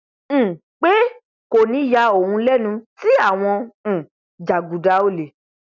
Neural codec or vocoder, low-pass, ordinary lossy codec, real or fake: none; 7.2 kHz; none; real